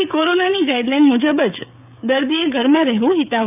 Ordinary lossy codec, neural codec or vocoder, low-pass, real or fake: none; codec, 16 kHz, 16 kbps, FreqCodec, smaller model; 3.6 kHz; fake